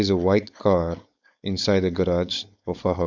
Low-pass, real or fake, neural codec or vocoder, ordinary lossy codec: 7.2 kHz; fake; codec, 16 kHz, 4.8 kbps, FACodec; none